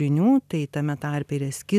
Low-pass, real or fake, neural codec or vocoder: 14.4 kHz; real; none